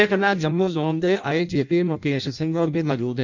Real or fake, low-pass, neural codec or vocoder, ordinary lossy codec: fake; 7.2 kHz; codec, 16 kHz in and 24 kHz out, 0.6 kbps, FireRedTTS-2 codec; none